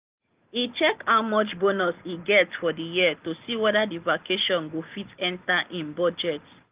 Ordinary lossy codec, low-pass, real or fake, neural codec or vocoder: Opus, 32 kbps; 3.6 kHz; real; none